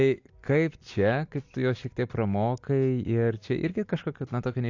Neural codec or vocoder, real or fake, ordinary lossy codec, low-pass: none; real; AAC, 48 kbps; 7.2 kHz